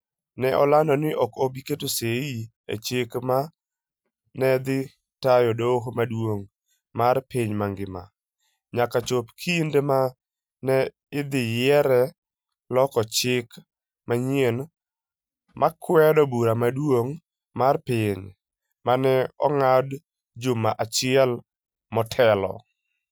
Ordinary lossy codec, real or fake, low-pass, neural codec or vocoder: none; real; none; none